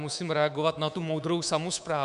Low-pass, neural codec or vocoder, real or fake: 10.8 kHz; codec, 24 kHz, 3.1 kbps, DualCodec; fake